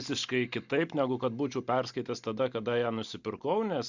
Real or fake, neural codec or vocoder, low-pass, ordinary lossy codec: real; none; 7.2 kHz; Opus, 64 kbps